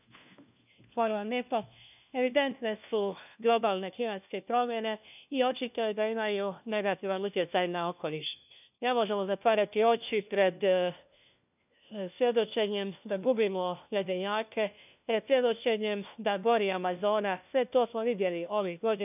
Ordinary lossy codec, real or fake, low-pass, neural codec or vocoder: none; fake; 3.6 kHz; codec, 16 kHz, 1 kbps, FunCodec, trained on LibriTTS, 50 frames a second